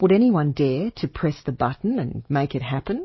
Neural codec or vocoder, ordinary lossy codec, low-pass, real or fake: codec, 44.1 kHz, 7.8 kbps, DAC; MP3, 24 kbps; 7.2 kHz; fake